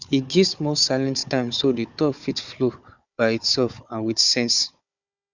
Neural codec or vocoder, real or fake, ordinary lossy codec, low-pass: codec, 16 kHz, 4 kbps, FunCodec, trained on Chinese and English, 50 frames a second; fake; none; 7.2 kHz